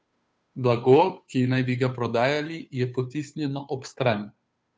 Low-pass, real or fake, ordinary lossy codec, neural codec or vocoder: none; fake; none; codec, 16 kHz, 2 kbps, FunCodec, trained on Chinese and English, 25 frames a second